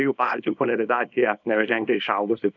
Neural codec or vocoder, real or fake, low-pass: codec, 24 kHz, 0.9 kbps, WavTokenizer, small release; fake; 7.2 kHz